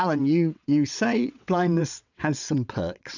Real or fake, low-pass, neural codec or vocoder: fake; 7.2 kHz; vocoder, 22.05 kHz, 80 mel bands, WaveNeXt